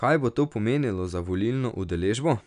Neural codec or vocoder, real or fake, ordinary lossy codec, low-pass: none; real; none; 10.8 kHz